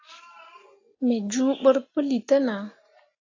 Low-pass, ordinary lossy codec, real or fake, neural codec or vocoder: 7.2 kHz; AAC, 32 kbps; real; none